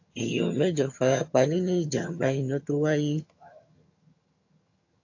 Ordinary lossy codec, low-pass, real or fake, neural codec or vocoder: AAC, 48 kbps; 7.2 kHz; fake; vocoder, 22.05 kHz, 80 mel bands, HiFi-GAN